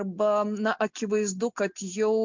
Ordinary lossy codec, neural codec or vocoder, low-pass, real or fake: MP3, 64 kbps; none; 7.2 kHz; real